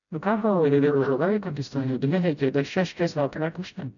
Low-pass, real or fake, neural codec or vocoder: 7.2 kHz; fake; codec, 16 kHz, 0.5 kbps, FreqCodec, smaller model